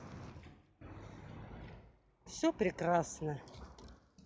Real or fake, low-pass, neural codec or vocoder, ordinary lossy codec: real; none; none; none